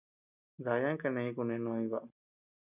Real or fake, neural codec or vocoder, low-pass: real; none; 3.6 kHz